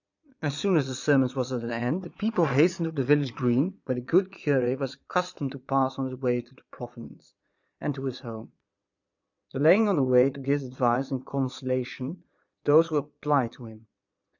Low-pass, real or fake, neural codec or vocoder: 7.2 kHz; fake; vocoder, 22.05 kHz, 80 mel bands, Vocos